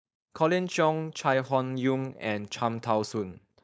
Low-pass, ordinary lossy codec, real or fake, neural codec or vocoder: none; none; fake; codec, 16 kHz, 4.8 kbps, FACodec